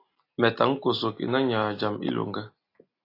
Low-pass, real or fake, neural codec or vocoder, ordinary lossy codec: 5.4 kHz; real; none; AAC, 32 kbps